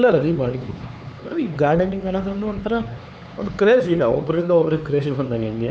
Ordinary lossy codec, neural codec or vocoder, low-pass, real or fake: none; codec, 16 kHz, 4 kbps, X-Codec, HuBERT features, trained on LibriSpeech; none; fake